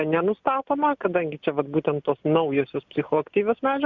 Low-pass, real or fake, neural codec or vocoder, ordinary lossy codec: 7.2 kHz; real; none; Opus, 64 kbps